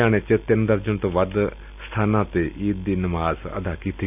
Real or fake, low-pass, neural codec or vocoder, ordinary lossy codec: real; 3.6 kHz; none; none